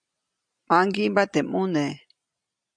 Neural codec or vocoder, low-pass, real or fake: none; 9.9 kHz; real